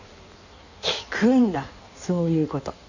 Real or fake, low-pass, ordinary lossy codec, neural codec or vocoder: fake; 7.2 kHz; none; codec, 16 kHz in and 24 kHz out, 1.1 kbps, FireRedTTS-2 codec